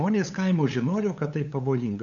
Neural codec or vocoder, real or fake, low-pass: codec, 16 kHz, 8 kbps, FunCodec, trained on LibriTTS, 25 frames a second; fake; 7.2 kHz